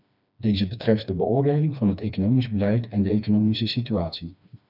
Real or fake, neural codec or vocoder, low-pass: fake; codec, 16 kHz, 2 kbps, FreqCodec, smaller model; 5.4 kHz